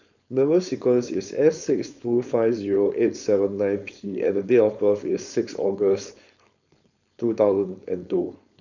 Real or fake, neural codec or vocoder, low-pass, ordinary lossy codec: fake; codec, 16 kHz, 4.8 kbps, FACodec; 7.2 kHz; none